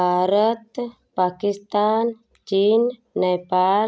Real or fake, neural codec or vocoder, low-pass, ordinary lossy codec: real; none; none; none